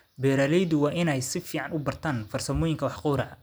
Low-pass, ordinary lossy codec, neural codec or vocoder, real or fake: none; none; none; real